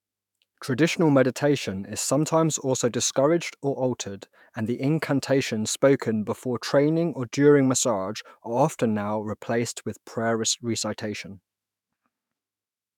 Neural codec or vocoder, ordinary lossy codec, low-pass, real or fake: autoencoder, 48 kHz, 128 numbers a frame, DAC-VAE, trained on Japanese speech; none; 19.8 kHz; fake